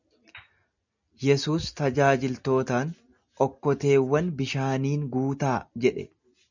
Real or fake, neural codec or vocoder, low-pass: real; none; 7.2 kHz